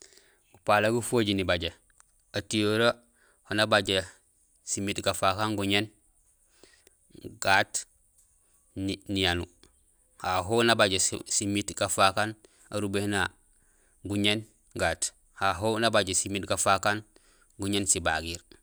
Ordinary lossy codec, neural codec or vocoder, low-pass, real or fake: none; none; none; real